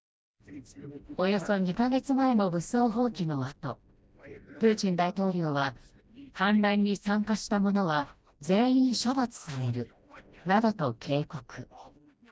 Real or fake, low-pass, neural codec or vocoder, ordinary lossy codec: fake; none; codec, 16 kHz, 1 kbps, FreqCodec, smaller model; none